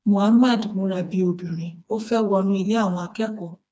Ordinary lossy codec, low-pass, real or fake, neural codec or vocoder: none; none; fake; codec, 16 kHz, 2 kbps, FreqCodec, smaller model